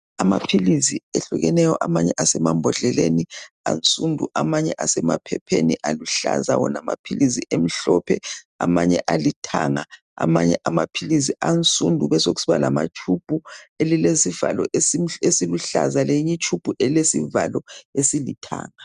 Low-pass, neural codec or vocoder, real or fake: 10.8 kHz; none; real